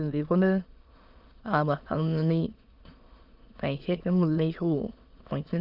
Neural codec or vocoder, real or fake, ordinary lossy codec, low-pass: autoencoder, 22.05 kHz, a latent of 192 numbers a frame, VITS, trained on many speakers; fake; Opus, 32 kbps; 5.4 kHz